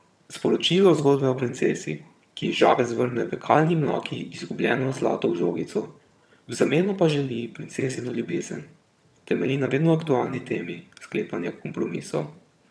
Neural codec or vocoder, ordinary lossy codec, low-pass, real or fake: vocoder, 22.05 kHz, 80 mel bands, HiFi-GAN; none; none; fake